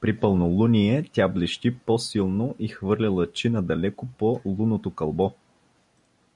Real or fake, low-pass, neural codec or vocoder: real; 10.8 kHz; none